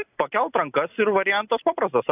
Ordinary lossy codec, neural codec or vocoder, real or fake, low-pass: AAC, 24 kbps; none; real; 3.6 kHz